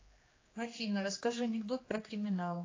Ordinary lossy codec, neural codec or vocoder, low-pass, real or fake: AAC, 32 kbps; codec, 16 kHz, 2 kbps, X-Codec, HuBERT features, trained on general audio; 7.2 kHz; fake